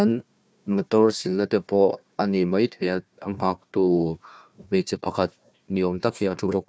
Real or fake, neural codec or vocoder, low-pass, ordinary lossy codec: fake; codec, 16 kHz, 1 kbps, FunCodec, trained on Chinese and English, 50 frames a second; none; none